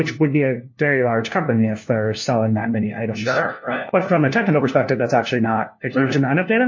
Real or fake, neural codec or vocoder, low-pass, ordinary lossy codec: fake; codec, 16 kHz, 1 kbps, FunCodec, trained on LibriTTS, 50 frames a second; 7.2 kHz; MP3, 32 kbps